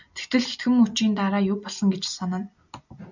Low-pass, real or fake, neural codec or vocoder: 7.2 kHz; real; none